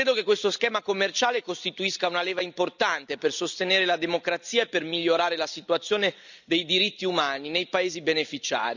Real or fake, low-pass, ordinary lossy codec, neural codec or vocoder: real; 7.2 kHz; none; none